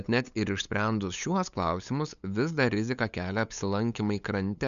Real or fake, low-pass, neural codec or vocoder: fake; 7.2 kHz; codec, 16 kHz, 8 kbps, FunCodec, trained on LibriTTS, 25 frames a second